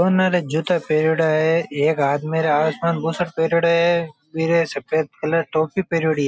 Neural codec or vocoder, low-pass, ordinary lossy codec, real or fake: none; none; none; real